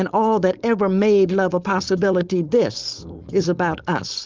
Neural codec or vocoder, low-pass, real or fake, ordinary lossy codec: codec, 16 kHz, 4.8 kbps, FACodec; 7.2 kHz; fake; Opus, 32 kbps